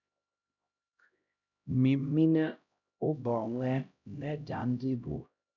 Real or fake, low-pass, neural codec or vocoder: fake; 7.2 kHz; codec, 16 kHz, 0.5 kbps, X-Codec, HuBERT features, trained on LibriSpeech